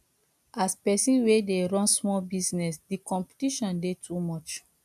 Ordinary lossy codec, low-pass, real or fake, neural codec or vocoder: none; 14.4 kHz; real; none